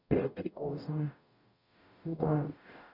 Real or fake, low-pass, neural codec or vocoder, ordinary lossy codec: fake; 5.4 kHz; codec, 44.1 kHz, 0.9 kbps, DAC; none